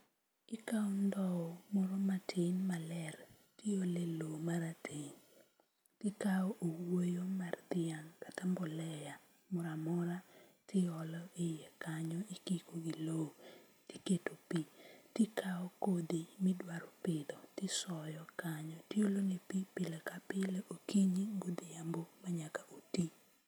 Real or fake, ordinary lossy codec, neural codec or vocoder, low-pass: real; none; none; none